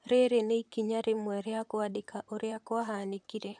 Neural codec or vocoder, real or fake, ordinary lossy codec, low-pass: vocoder, 44.1 kHz, 128 mel bands, Pupu-Vocoder; fake; none; 9.9 kHz